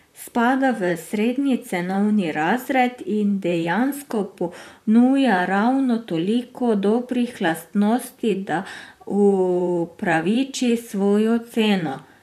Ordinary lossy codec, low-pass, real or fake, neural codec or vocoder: none; 14.4 kHz; fake; vocoder, 44.1 kHz, 128 mel bands, Pupu-Vocoder